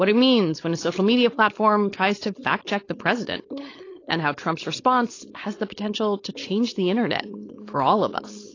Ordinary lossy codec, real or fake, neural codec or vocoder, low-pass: AAC, 32 kbps; fake; codec, 16 kHz, 4.8 kbps, FACodec; 7.2 kHz